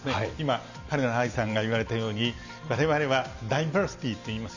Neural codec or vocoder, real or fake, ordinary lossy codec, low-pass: none; real; none; 7.2 kHz